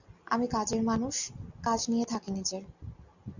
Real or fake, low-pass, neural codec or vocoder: real; 7.2 kHz; none